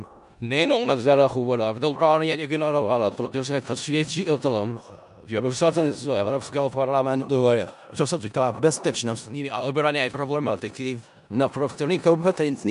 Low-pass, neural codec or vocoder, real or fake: 10.8 kHz; codec, 16 kHz in and 24 kHz out, 0.4 kbps, LongCat-Audio-Codec, four codebook decoder; fake